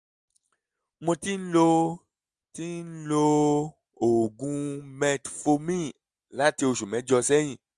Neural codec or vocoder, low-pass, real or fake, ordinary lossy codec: none; 10.8 kHz; real; Opus, 32 kbps